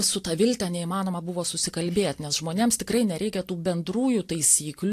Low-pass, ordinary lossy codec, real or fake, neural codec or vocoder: 14.4 kHz; AAC, 64 kbps; real; none